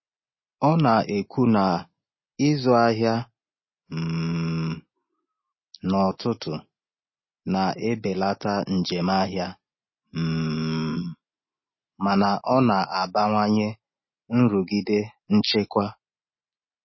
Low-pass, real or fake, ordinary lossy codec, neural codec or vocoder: 7.2 kHz; real; MP3, 24 kbps; none